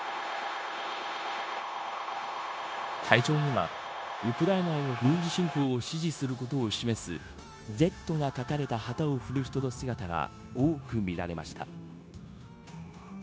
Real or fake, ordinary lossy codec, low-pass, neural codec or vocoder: fake; none; none; codec, 16 kHz, 0.9 kbps, LongCat-Audio-Codec